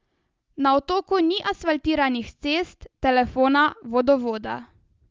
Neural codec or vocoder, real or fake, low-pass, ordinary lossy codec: none; real; 7.2 kHz; Opus, 24 kbps